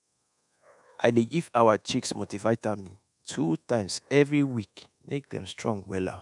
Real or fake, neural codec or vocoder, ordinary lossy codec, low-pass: fake; codec, 24 kHz, 1.2 kbps, DualCodec; none; 10.8 kHz